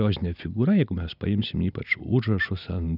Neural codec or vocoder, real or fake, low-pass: none; real; 5.4 kHz